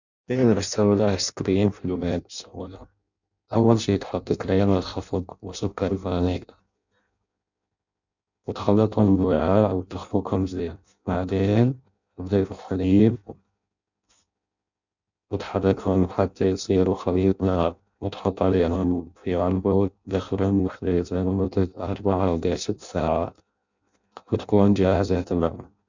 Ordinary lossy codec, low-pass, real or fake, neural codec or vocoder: none; 7.2 kHz; fake; codec, 16 kHz in and 24 kHz out, 0.6 kbps, FireRedTTS-2 codec